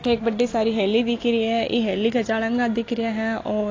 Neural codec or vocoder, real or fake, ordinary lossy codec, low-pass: none; real; AAC, 32 kbps; 7.2 kHz